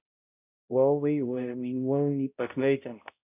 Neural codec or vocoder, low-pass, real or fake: codec, 16 kHz, 0.5 kbps, X-Codec, HuBERT features, trained on balanced general audio; 3.6 kHz; fake